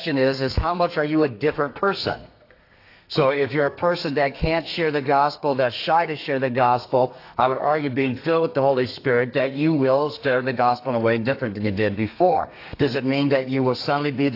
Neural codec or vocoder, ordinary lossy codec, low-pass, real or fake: codec, 32 kHz, 1.9 kbps, SNAC; AAC, 32 kbps; 5.4 kHz; fake